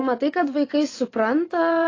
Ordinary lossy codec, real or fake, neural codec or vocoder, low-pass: AAC, 32 kbps; fake; vocoder, 24 kHz, 100 mel bands, Vocos; 7.2 kHz